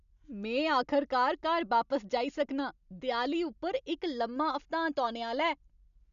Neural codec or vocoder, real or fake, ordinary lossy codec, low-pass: none; real; none; 7.2 kHz